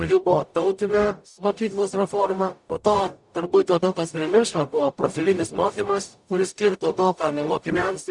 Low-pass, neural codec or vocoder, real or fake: 10.8 kHz; codec, 44.1 kHz, 0.9 kbps, DAC; fake